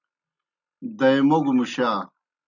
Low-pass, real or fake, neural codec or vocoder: 7.2 kHz; real; none